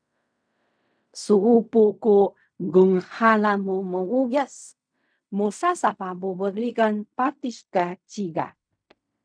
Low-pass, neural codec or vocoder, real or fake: 9.9 kHz; codec, 16 kHz in and 24 kHz out, 0.4 kbps, LongCat-Audio-Codec, fine tuned four codebook decoder; fake